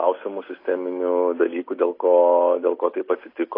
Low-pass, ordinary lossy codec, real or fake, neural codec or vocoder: 5.4 kHz; AAC, 24 kbps; real; none